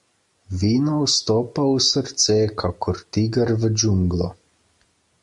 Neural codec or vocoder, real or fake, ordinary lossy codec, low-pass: none; real; MP3, 64 kbps; 10.8 kHz